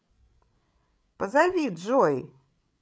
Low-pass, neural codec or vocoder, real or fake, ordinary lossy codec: none; codec, 16 kHz, 16 kbps, FreqCodec, larger model; fake; none